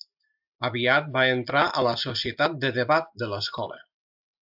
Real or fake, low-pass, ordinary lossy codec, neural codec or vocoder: real; 5.4 kHz; AAC, 48 kbps; none